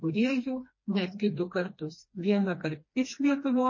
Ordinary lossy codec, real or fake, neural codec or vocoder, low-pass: MP3, 32 kbps; fake; codec, 16 kHz, 2 kbps, FreqCodec, smaller model; 7.2 kHz